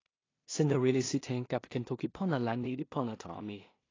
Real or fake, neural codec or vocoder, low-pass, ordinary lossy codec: fake; codec, 16 kHz in and 24 kHz out, 0.4 kbps, LongCat-Audio-Codec, two codebook decoder; 7.2 kHz; AAC, 32 kbps